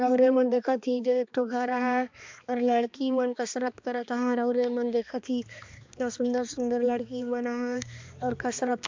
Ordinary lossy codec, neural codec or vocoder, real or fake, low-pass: MP3, 64 kbps; codec, 16 kHz, 2 kbps, X-Codec, HuBERT features, trained on balanced general audio; fake; 7.2 kHz